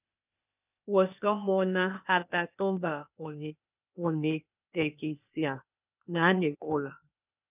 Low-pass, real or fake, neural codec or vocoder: 3.6 kHz; fake; codec, 16 kHz, 0.8 kbps, ZipCodec